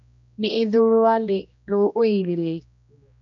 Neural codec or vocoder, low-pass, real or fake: codec, 16 kHz, 1 kbps, X-Codec, HuBERT features, trained on general audio; 7.2 kHz; fake